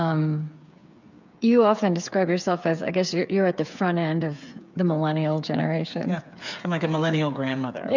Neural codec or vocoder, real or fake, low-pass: codec, 16 kHz, 8 kbps, FreqCodec, smaller model; fake; 7.2 kHz